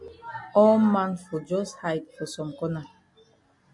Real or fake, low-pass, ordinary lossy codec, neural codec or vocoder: real; 10.8 kHz; MP3, 64 kbps; none